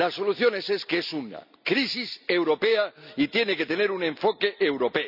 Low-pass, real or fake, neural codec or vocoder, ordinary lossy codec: 5.4 kHz; real; none; none